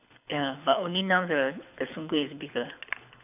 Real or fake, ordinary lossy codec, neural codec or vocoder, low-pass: fake; none; codec, 24 kHz, 6 kbps, HILCodec; 3.6 kHz